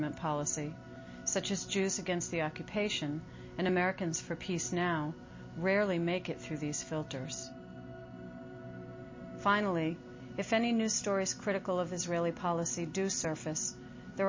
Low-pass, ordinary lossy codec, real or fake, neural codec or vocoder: 7.2 kHz; MP3, 32 kbps; real; none